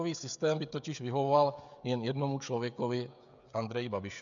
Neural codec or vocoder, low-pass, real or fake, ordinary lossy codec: codec, 16 kHz, 16 kbps, FreqCodec, smaller model; 7.2 kHz; fake; MP3, 96 kbps